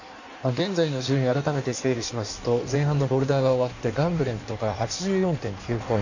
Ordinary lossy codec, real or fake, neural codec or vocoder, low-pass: none; fake; codec, 16 kHz in and 24 kHz out, 1.1 kbps, FireRedTTS-2 codec; 7.2 kHz